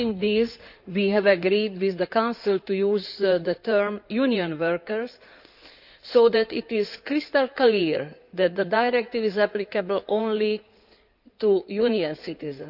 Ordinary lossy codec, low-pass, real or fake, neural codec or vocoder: none; 5.4 kHz; fake; codec, 16 kHz in and 24 kHz out, 2.2 kbps, FireRedTTS-2 codec